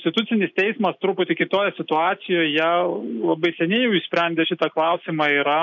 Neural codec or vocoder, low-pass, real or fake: none; 7.2 kHz; real